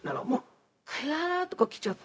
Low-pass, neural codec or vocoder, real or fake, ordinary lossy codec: none; codec, 16 kHz, 0.4 kbps, LongCat-Audio-Codec; fake; none